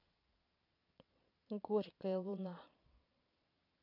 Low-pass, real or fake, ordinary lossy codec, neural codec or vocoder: 5.4 kHz; fake; none; vocoder, 22.05 kHz, 80 mel bands, Vocos